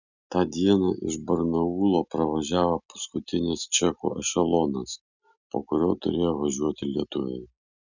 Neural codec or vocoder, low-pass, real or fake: none; 7.2 kHz; real